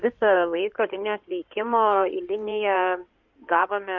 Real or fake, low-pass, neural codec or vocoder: fake; 7.2 kHz; codec, 16 kHz in and 24 kHz out, 2.2 kbps, FireRedTTS-2 codec